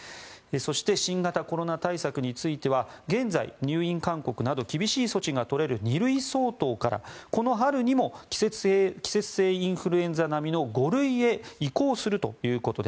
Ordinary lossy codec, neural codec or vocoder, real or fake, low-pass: none; none; real; none